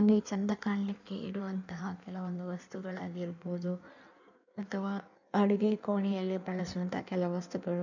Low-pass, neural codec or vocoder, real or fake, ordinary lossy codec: 7.2 kHz; codec, 16 kHz in and 24 kHz out, 1.1 kbps, FireRedTTS-2 codec; fake; none